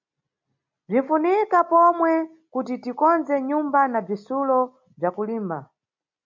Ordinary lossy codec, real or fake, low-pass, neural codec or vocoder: MP3, 48 kbps; real; 7.2 kHz; none